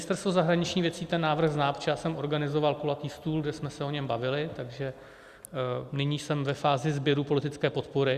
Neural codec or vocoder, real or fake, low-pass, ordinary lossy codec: none; real; 14.4 kHz; Opus, 64 kbps